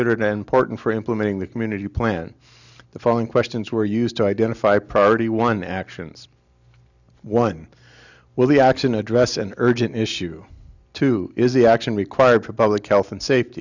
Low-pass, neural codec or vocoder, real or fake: 7.2 kHz; none; real